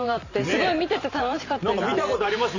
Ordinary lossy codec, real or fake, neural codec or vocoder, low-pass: MP3, 64 kbps; fake; vocoder, 44.1 kHz, 128 mel bands every 512 samples, BigVGAN v2; 7.2 kHz